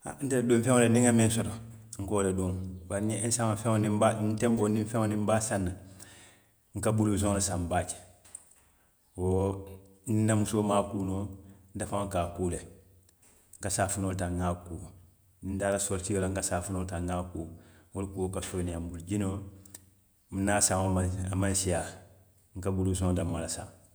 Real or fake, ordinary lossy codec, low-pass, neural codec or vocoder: fake; none; none; vocoder, 48 kHz, 128 mel bands, Vocos